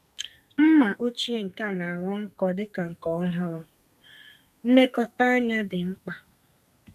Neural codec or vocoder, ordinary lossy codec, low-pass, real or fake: codec, 32 kHz, 1.9 kbps, SNAC; none; 14.4 kHz; fake